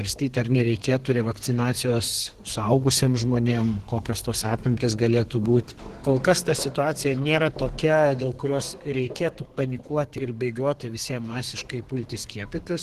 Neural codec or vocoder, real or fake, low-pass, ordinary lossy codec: codec, 32 kHz, 1.9 kbps, SNAC; fake; 14.4 kHz; Opus, 16 kbps